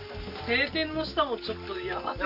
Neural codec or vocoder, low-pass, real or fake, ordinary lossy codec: none; 5.4 kHz; real; none